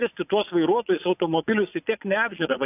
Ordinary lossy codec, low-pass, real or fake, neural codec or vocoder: AAC, 32 kbps; 3.6 kHz; fake; vocoder, 22.05 kHz, 80 mel bands, Vocos